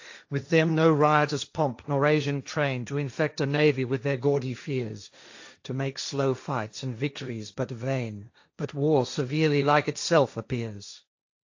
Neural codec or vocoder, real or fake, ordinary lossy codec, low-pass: codec, 16 kHz, 1.1 kbps, Voila-Tokenizer; fake; AAC, 48 kbps; 7.2 kHz